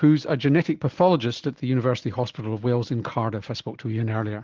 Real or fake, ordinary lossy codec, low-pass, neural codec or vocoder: real; Opus, 16 kbps; 7.2 kHz; none